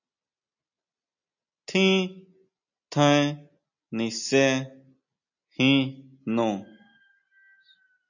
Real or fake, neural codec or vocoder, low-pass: real; none; 7.2 kHz